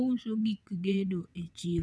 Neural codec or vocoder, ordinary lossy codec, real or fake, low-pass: vocoder, 22.05 kHz, 80 mel bands, WaveNeXt; none; fake; none